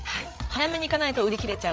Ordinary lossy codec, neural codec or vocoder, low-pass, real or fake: none; codec, 16 kHz, 8 kbps, FreqCodec, larger model; none; fake